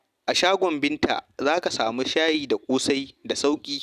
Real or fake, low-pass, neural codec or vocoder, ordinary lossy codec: real; 14.4 kHz; none; none